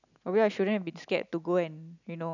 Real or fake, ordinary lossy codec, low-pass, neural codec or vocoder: real; none; 7.2 kHz; none